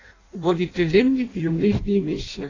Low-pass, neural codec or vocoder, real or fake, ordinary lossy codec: 7.2 kHz; codec, 16 kHz in and 24 kHz out, 0.6 kbps, FireRedTTS-2 codec; fake; AAC, 32 kbps